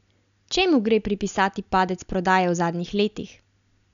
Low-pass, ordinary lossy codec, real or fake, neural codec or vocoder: 7.2 kHz; MP3, 96 kbps; real; none